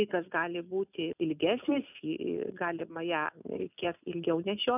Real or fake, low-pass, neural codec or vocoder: real; 3.6 kHz; none